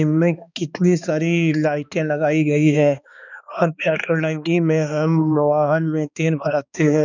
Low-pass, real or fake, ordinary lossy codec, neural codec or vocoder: 7.2 kHz; fake; none; codec, 16 kHz, 2 kbps, X-Codec, HuBERT features, trained on balanced general audio